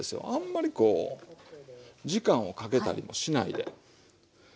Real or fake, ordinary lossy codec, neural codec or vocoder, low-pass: real; none; none; none